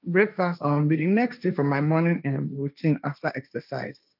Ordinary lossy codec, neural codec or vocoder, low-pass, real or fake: none; codec, 16 kHz, 1.1 kbps, Voila-Tokenizer; 5.4 kHz; fake